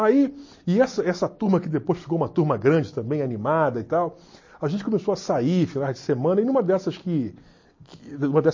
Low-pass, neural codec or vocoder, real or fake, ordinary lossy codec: 7.2 kHz; none; real; MP3, 32 kbps